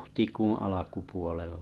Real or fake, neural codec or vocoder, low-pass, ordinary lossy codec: real; none; 19.8 kHz; Opus, 16 kbps